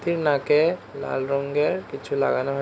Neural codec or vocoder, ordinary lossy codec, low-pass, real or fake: none; none; none; real